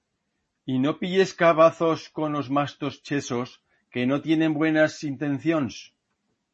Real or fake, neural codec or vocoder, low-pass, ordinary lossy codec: real; none; 9.9 kHz; MP3, 32 kbps